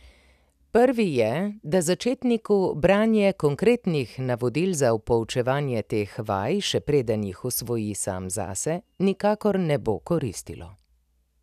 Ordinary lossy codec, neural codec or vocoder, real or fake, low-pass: none; none; real; 14.4 kHz